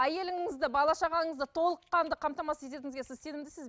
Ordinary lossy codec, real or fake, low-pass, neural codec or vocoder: none; real; none; none